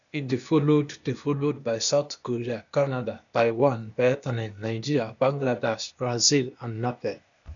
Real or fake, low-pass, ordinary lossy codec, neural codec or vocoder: fake; 7.2 kHz; none; codec, 16 kHz, 0.8 kbps, ZipCodec